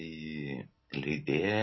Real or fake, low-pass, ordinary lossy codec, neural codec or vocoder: real; 7.2 kHz; MP3, 24 kbps; none